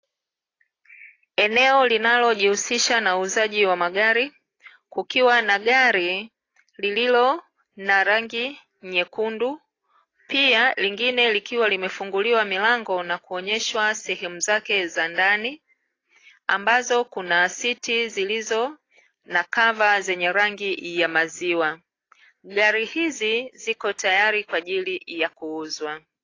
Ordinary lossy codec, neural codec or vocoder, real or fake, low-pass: AAC, 32 kbps; none; real; 7.2 kHz